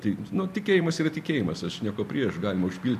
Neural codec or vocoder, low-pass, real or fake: none; 14.4 kHz; real